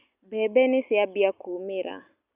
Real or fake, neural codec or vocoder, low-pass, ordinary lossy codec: real; none; 3.6 kHz; Opus, 64 kbps